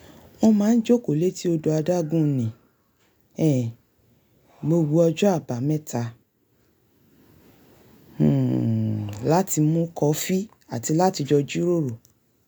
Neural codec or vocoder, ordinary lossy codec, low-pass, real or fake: none; none; none; real